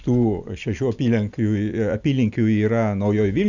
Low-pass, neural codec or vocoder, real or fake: 7.2 kHz; none; real